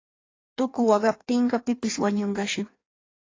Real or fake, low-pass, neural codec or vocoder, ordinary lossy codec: fake; 7.2 kHz; codec, 16 kHz in and 24 kHz out, 1.1 kbps, FireRedTTS-2 codec; AAC, 32 kbps